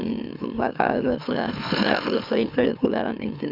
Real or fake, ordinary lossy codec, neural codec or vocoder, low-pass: fake; none; autoencoder, 44.1 kHz, a latent of 192 numbers a frame, MeloTTS; 5.4 kHz